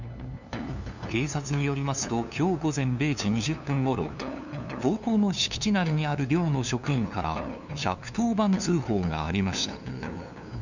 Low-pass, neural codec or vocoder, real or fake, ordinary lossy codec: 7.2 kHz; codec, 16 kHz, 2 kbps, FunCodec, trained on LibriTTS, 25 frames a second; fake; none